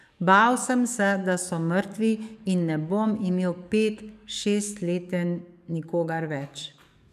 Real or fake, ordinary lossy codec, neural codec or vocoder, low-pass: fake; none; codec, 44.1 kHz, 7.8 kbps, DAC; 14.4 kHz